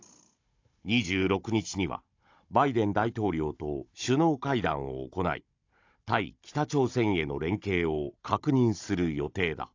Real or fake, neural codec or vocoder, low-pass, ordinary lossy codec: fake; codec, 16 kHz, 16 kbps, FunCodec, trained on Chinese and English, 50 frames a second; 7.2 kHz; AAC, 48 kbps